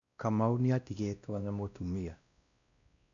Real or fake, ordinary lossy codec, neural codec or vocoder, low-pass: fake; none; codec, 16 kHz, 1 kbps, X-Codec, WavLM features, trained on Multilingual LibriSpeech; 7.2 kHz